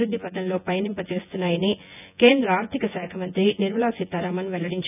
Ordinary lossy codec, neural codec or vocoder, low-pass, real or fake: none; vocoder, 24 kHz, 100 mel bands, Vocos; 3.6 kHz; fake